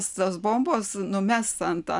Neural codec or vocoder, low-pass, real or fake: none; 10.8 kHz; real